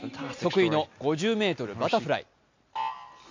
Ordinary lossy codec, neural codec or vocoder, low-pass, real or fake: MP3, 48 kbps; none; 7.2 kHz; real